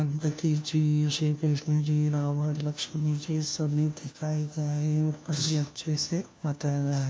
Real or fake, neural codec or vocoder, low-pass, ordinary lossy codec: fake; codec, 16 kHz, 1 kbps, FunCodec, trained on LibriTTS, 50 frames a second; none; none